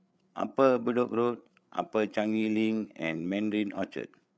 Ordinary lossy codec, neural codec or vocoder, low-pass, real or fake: none; codec, 16 kHz, 8 kbps, FreqCodec, larger model; none; fake